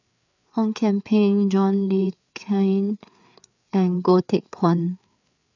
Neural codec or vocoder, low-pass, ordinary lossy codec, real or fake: codec, 16 kHz, 4 kbps, FreqCodec, larger model; 7.2 kHz; none; fake